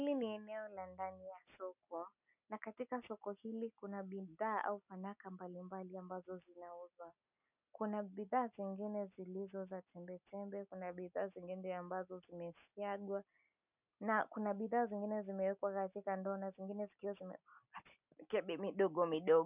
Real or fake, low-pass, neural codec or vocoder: real; 3.6 kHz; none